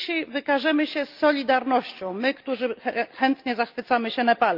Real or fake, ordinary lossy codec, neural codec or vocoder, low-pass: real; Opus, 24 kbps; none; 5.4 kHz